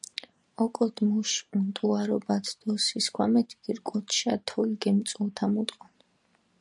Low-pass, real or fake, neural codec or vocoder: 10.8 kHz; real; none